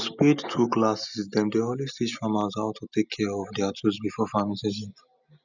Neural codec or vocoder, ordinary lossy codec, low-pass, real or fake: none; none; 7.2 kHz; real